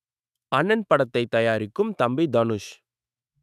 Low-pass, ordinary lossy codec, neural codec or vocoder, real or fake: 14.4 kHz; none; autoencoder, 48 kHz, 128 numbers a frame, DAC-VAE, trained on Japanese speech; fake